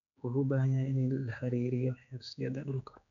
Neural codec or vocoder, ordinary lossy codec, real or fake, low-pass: codec, 16 kHz, 4 kbps, X-Codec, HuBERT features, trained on general audio; none; fake; 7.2 kHz